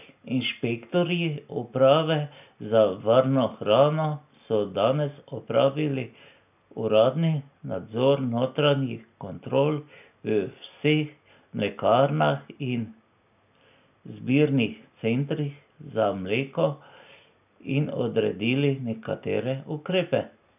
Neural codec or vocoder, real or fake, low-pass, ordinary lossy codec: none; real; 3.6 kHz; none